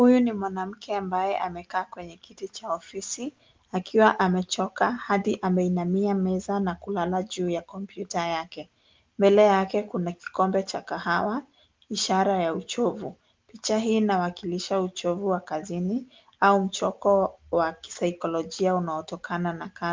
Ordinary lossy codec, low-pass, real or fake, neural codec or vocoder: Opus, 32 kbps; 7.2 kHz; real; none